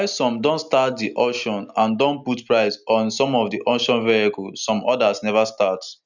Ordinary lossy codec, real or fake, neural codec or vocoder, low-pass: none; real; none; 7.2 kHz